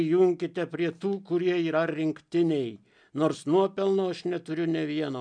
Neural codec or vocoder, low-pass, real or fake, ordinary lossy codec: none; 9.9 kHz; real; MP3, 96 kbps